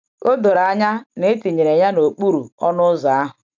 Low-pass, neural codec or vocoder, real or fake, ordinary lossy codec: none; none; real; none